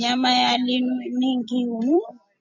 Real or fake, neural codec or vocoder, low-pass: fake; vocoder, 44.1 kHz, 128 mel bands every 256 samples, BigVGAN v2; 7.2 kHz